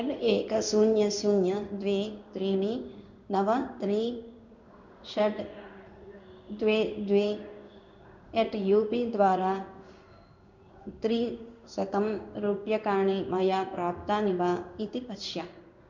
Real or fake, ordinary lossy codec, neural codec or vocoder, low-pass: fake; none; codec, 16 kHz in and 24 kHz out, 1 kbps, XY-Tokenizer; 7.2 kHz